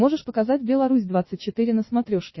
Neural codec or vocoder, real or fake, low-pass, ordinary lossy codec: vocoder, 44.1 kHz, 80 mel bands, Vocos; fake; 7.2 kHz; MP3, 24 kbps